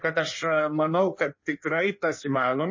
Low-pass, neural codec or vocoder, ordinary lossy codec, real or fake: 7.2 kHz; codec, 16 kHz in and 24 kHz out, 1.1 kbps, FireRedTTS-2 codec; MP3, 32 kbps; fake